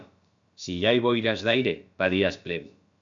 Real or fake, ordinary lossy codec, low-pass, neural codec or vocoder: fake; MP3, 64 kbps; 7.2 kHz; codec, 16 kHz, about 1 kbps, DyCAST, with the encoder's durations